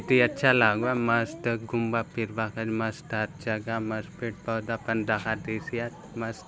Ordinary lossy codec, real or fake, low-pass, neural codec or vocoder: none; real; none; none